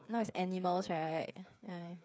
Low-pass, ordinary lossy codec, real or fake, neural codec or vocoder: none; none; fake; codec, 16 kHz, 8 kbps, FreqCodec, smaller model